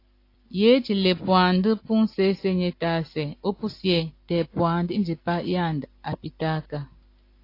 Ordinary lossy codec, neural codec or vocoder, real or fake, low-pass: AAC, 32 kbps; none; real; 5.4 kHz